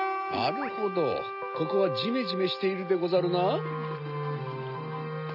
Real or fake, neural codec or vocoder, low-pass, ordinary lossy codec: real; none; 5.4 kHz; none